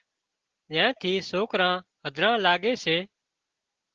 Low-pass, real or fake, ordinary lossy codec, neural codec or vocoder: 7.2 kHz; real; Opus, 16 kbps; none